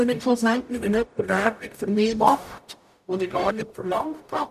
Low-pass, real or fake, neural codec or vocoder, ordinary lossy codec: 14.4 kHz; fake; codec, 44.1 kHz, 0.9 kbps, DAC; none